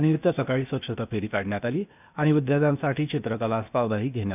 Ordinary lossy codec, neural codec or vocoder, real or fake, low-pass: none; codec, 16 kHz, 0.8 kbps, ZipCodec; fake; 3.6 kHz